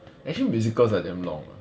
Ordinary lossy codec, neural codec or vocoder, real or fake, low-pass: none; none; real; none